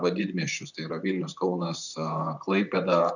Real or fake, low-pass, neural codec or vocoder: fake; 7.2 kHz; vocoder, 44.1 kHz, 128 mel bands, Pupu-Vocoder